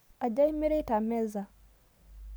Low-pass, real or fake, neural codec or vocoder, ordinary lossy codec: none; real; none; none